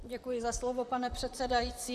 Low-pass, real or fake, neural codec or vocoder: 14.4 kHz; fake; vocoder, 44.1 kHz, 128 mel bands, Pupu-Vocoder